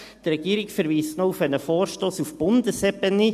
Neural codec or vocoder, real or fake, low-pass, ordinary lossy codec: none; real; 14.4 kHz; AAC, 96 kbps